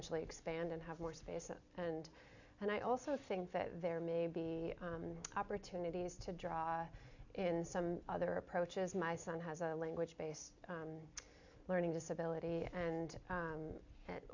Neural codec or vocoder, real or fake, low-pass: none; real; 7.2 kHz